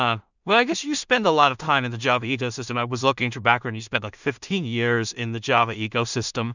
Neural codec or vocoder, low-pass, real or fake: codec, 16 kHz in and 24 kHz out, 0.4 kbps, LongCat-Audio-Codec, two codebook decoder; 7.2 kHz; fake